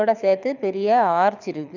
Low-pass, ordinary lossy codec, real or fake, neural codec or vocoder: 7.2 kHz; none; fake; codec, 24 kHz, 6 kbps, HILCodec